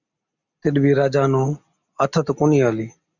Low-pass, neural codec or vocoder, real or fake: 7.2 kHz; none; real